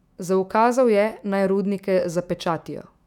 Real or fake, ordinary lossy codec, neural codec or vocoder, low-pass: fake; none; autoencoder, 48 kHz, 128 numbers a frame, DAC-VAE, trained on Japanese speech; 19.8 kHz